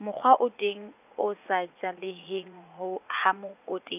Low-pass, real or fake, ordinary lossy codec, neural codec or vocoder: 3.6 kHz; fake; none; vocoder, 44.1 kHz, 80 mel bands, Vocos